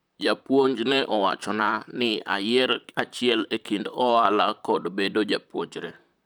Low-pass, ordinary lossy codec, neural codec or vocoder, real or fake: none; none; vocoder, 44.1 kHz, 128 mel bands, Pupu-Vocoder; fake